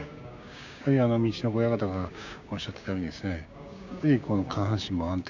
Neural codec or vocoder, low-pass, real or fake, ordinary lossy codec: codec, 16 kHz, 6 kbps, DAC; 7.2 kHz; fake; none